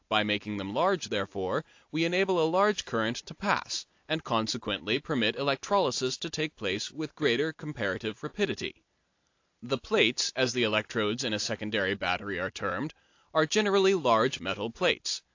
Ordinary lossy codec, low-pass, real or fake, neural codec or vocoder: AAC, 48 kbps; 7.2 kHz; real; none